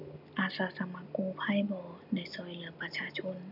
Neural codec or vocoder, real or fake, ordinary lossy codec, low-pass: none; real; none; 5.4 kHz